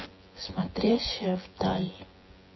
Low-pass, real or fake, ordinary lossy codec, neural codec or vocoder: 7.2 kHz; fake; MP3, 24 kbps; vocoder, 24 kHz, 100 mel bands, Vocos